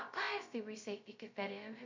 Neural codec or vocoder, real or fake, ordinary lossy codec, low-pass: codec, 16 kHz, 0.2 kbps, FocalCodec; fake; MP3, 48 kbps; 7.2 kHz